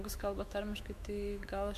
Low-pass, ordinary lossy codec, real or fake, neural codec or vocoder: 14.4 kHz; MP3, 64 kbps; fake; autoencoder, 48 kHz, 128 numbers a frame, DAC-VAE, trained on Japanese speech